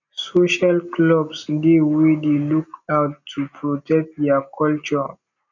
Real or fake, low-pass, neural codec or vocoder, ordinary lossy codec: real; 7.2 kHz; none; none